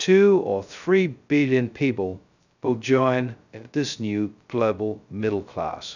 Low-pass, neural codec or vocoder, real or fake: 7.2 kHz; codec, 16 kHz, 0.2 kbps, FocalCodec; fake